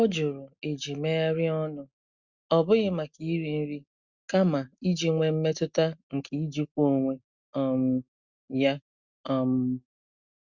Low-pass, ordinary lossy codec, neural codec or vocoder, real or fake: 7.2 kHz; Opus, 64 kbps; none; real